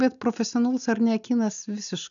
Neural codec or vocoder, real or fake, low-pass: none; real; 7.2 kHz